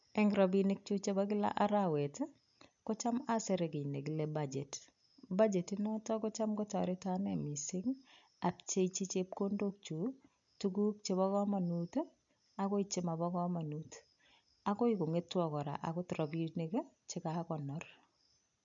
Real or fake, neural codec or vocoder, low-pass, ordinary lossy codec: real; none; 7.2 kHz; MP3, 64 kbps